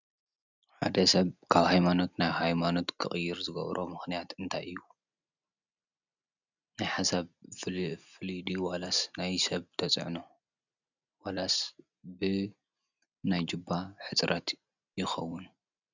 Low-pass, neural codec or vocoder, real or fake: 7.2 kHz; none; real